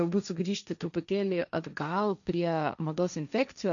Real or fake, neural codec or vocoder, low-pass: fake; codec, 16 kHz, 1.1 kbps, Voila-Tokenizer; 7.2 kHz